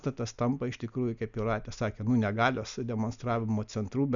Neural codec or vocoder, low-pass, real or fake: none; 7.2 kHz; real